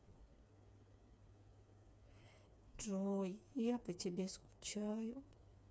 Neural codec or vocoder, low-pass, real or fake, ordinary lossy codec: codec, 16 kHz, 4 kbps, FreqCodec, smaller model; none; fake; none